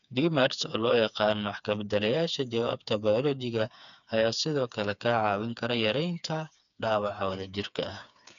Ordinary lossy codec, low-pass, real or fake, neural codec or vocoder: none; 7.2 kHz; fake; codec, 16 kHz, 4 kbps, FreqCodec, smaller model